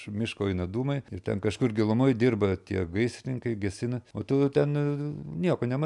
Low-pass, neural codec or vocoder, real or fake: 10.8 kHz; none; real